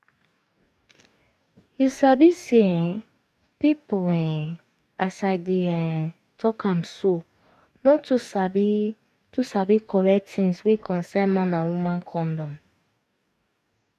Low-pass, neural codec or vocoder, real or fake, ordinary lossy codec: 14.4 kHz; codec, 44.1 kHz, 2.6 kbps, DAC; fake; none